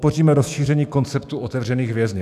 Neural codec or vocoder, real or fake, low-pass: autoencoder, 48 kHz, 128 numbers a frame, DAC-VAE, trained on Japanese speech; fake; 14.4 kHz